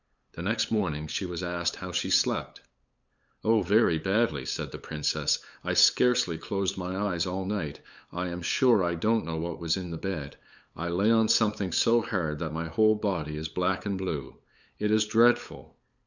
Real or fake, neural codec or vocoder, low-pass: fake; codec, 16 kHz, 8 kbps, FunCodec, trained on LibriTTS, 25 frames a second; 7.2 kHz